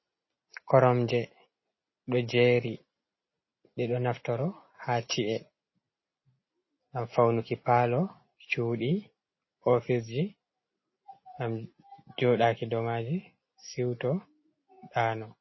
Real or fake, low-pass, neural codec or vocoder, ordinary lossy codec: real; 7.2 kHz; none; MP3, 24 kbps